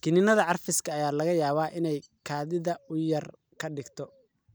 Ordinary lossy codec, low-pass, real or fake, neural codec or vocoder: none; none; real; none